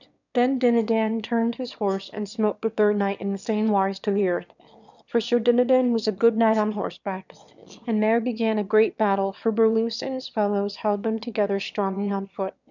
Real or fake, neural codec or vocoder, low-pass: fake; autoencoder, 22.05 kHz, a latent of 192 numbers a frame, VITS, trained on one speaker; 7.2 kHz